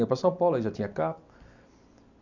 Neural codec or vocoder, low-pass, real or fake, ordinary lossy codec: none; 7.2 kHz; real; none